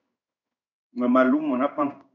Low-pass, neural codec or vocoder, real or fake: 7.2 kHz; codec, 16 kHz in and 24 kHz out, 1 kbps, XY-Tokenizer; fake